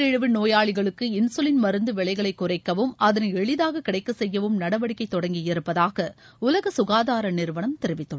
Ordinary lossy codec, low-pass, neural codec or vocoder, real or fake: none; none; none; real